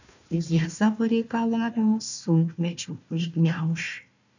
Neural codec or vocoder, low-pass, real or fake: codec, 16 kHz, 1 kbps, FunCodec, trained on Chinese and English, 50 frames a second; 7.2 kHz; fake